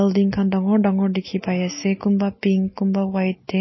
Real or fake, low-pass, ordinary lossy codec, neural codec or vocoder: real; 7.2 kHz; MP3, 24 kbps; none